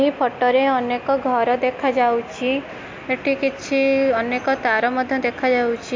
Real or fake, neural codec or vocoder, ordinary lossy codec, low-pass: real; none; MP3, 48 kbps; 7.2 kHz